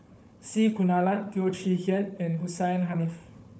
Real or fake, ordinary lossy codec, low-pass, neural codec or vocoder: fake; none; none; codec, 16 kHz, 4 kbps, FunCodec, trained on Chinese and English, 50 frames a second